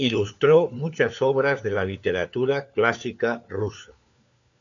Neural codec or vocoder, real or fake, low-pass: codec, 16 kHz, 4 kbps, FunCodec, trained on LibriTTS, 50 frames a second; fake; 7.2 kHz